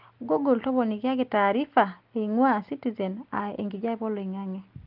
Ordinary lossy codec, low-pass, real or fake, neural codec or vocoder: Opus, 32 kbps; 5.4 kHz; real; none